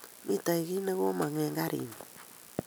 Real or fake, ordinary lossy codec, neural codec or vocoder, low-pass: real; none; none; none